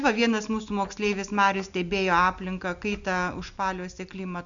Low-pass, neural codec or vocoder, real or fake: 7.2 kHz; none; real